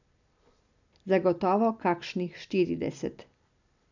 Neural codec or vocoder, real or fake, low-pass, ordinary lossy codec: none; real; 7.2 kHz; none